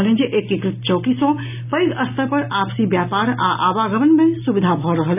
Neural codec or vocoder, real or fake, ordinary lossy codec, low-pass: none; real; none; 3.6 kHz